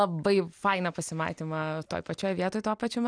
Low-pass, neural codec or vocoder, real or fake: 9.9 kHz; none; real